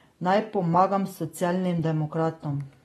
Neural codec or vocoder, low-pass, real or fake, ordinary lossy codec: none; 19.8 kHz; real; AAC, 32 kbps